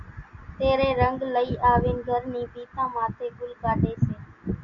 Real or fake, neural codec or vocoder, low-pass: real; none; 7.2 kHz